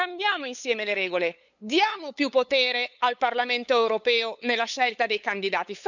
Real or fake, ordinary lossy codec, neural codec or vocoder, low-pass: fake; none; codec, 16 kHz, 8 kbps, FunCodec, trained on LibriTTS, 25 frames a second; 7.2 kHz